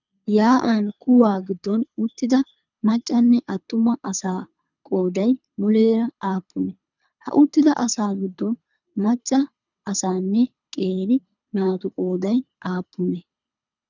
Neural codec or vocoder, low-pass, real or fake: codec, 24 kHz, 3 kbps, HILCodec; 7.2 kHz; fake